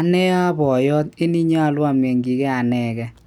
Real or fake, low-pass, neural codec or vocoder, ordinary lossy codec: real; 19.8 kHz; none; none